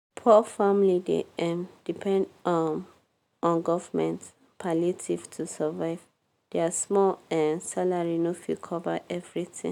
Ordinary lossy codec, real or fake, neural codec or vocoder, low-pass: none; real; none; 19.8 kHz